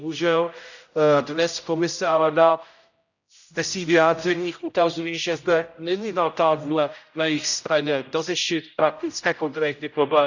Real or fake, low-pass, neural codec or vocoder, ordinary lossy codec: fake; 7.2 kHz; codec, 16 kHz, 0.5 kbps, X-Codec, HuBERT features, trained on general audio; none